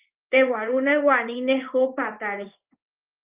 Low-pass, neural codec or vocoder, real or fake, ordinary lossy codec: 3.6 kHz; codec, 16 kHz in and 24 kHz out, 1 kbps, XY-Tokenizer; fake; Opus, 32 kbps